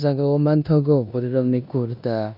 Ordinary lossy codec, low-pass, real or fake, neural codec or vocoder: none; 5.4 kHz; fake; codec, 16 kHz in and 24 kHz out, 0.9 kbps, LongCat-Audio-Codec, four codebook decoder